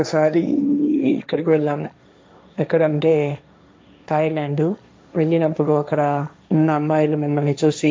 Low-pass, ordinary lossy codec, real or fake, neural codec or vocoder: none; none; fake; codec, 16 kHz, 1.1 kbps, Voila-Tokenizer